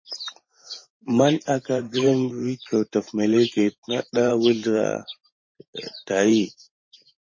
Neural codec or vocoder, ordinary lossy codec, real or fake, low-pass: vocoder, 44.1 kHz, 128 mel bands every 512 samples, BigVGAN v2; MP3, 32 kbps; fake; 7.2 kHz